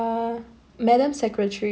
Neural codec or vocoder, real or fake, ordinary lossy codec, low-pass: none; real; none; none